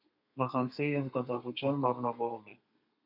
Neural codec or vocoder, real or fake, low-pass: codec, 32 kHz, 1.9 kbps, SNAC; fake; 5.4 kHz